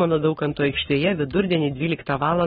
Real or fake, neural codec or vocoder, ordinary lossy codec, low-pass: fake; codec, 44.1 kHz, 7.8 kbps, Pupu-Codec; AAC, 16 kbps; 19.8 kHz